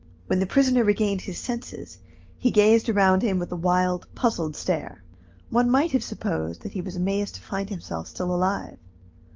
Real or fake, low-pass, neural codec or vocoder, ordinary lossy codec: real; 7.2 kHz; none; Opus, 24 kbps